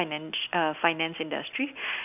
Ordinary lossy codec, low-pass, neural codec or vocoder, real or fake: none; 3.6 kHz; none; real